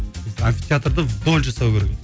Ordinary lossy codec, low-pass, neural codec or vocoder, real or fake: none; none; none; real